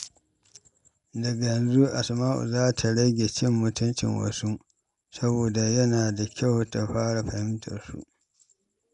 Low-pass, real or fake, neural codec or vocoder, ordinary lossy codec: 10.8 kHz; real; none; none